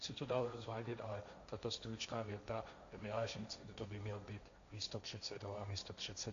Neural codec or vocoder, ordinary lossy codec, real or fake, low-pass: codec, 16 kHz, 1.1 kbps, Voila-Tokenizer; MP3, 64 kbps; fake; 7.2 kHz